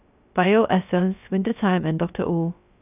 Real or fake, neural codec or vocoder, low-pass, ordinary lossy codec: fake; codec, 16 kHz, 0.3 kbps, FocalCodec; 3.6 kHz; none